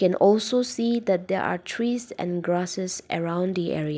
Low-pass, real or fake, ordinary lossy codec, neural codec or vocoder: none; real; none; none